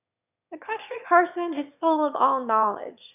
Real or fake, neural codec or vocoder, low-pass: fake; autoencoder, 22.05 kHz, a latent of 192 numbers a frame, VITS, trained on one speaker; 3.6 kHz